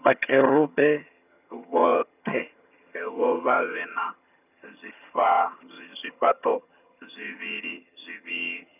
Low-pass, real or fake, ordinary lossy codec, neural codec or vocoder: 3.6 kHz; fake; none; vocoder, 22.05 kHz, 80 mel bands, HiFi-GAN